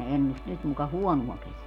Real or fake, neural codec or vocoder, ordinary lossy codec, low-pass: real; none; none; 19.8 kHz